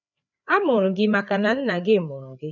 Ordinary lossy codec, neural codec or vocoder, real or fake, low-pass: none; codec, 16 kHz, 4 kbps, FreqCodec, larger model; fake; 7.2 kHz